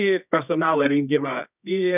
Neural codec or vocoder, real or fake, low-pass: codec, 24 kHz, 0.9 kbps, WavTokenizer, medium music audio release; fake; 3.6 kHz